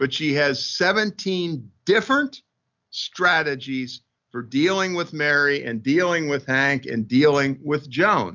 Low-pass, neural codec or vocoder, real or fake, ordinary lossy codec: 7.2 kHz; none; real; MP3, 64 kbps